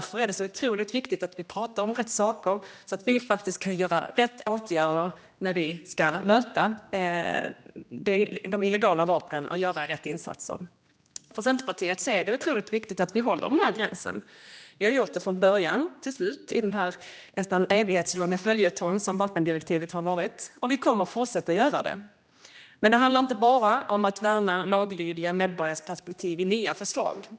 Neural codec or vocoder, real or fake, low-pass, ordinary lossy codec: codec, 16 kHz, 1 kbps, X-Codec, HuBERT features, trained on general audio; fake; none; none